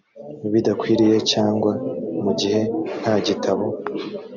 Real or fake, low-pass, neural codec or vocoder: real; 7.2 kHz; none